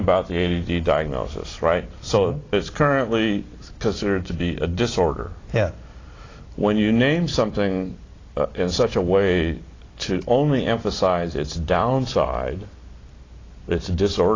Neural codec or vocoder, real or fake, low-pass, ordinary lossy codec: none; real; 7.2 kHz; AAC, 32 kbps